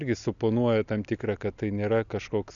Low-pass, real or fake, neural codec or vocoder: 7.2 kHz; real; none